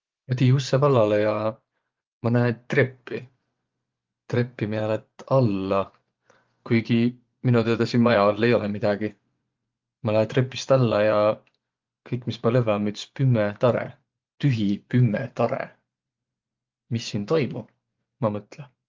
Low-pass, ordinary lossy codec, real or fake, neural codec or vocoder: 7.2 kHz; Opus, 32 kbps; fake; vocoder, 24 kHz, 100 mel bands, Vocos